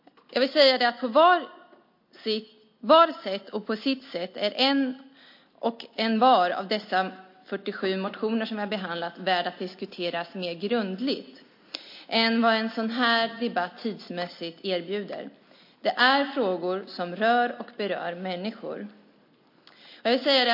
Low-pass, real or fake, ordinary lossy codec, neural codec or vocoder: 5.4 kHz; real; MP3, 32 kbps; none